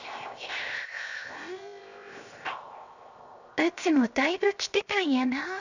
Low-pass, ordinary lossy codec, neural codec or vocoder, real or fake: 7.2 kHz; none; codec, 16 kHz, 0.7 kbps, FocalCodec; fake